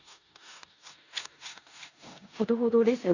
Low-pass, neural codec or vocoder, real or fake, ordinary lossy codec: 7.2 kHz; codec, 16 kHz in and 24 kHz out, 0.9 kbps, LongCat-Audio-Codec, four codebook decoder; fake; none